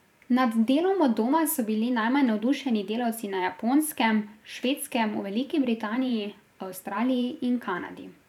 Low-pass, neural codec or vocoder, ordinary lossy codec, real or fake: 19.8 kHz; vocoder, 44.1 kHz, 128 mel bands every 512 samples, BigVGAN v2; none; fake